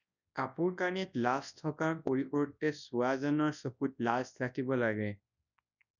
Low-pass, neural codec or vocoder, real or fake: 7.2 kHz; codec, 24 kHz, 0.9 kbps, WavTokenizer, large speech release; fake